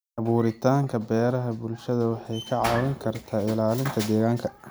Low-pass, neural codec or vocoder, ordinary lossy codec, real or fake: none; none; none; real